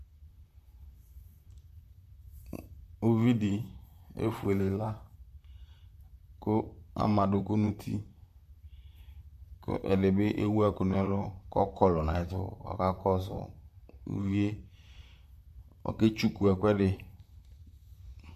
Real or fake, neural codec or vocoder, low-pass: fake; vocoder, 44.1 kHz, 128 mel bands, Pupu-Vocoder; 14.4 kHz